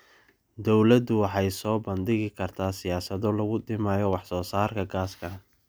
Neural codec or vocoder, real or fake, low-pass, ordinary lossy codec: none; real; none; none